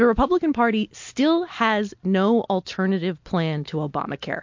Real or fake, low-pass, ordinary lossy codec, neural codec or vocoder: real; 7.2 kHz; MP3, 48 kbps; none